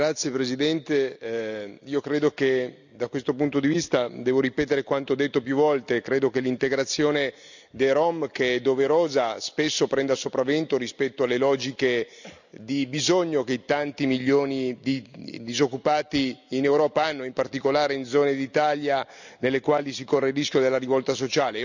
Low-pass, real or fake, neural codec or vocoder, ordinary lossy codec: 7.2 kHz; real; none; none